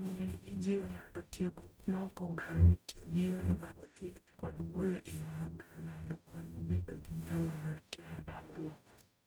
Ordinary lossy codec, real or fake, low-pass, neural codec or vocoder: none; fake; none; codec, 44.1 kHz, 0.9 kbps, DAC